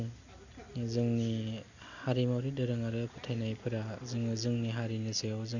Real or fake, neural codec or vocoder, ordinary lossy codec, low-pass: real; none; none; 7.2 kHz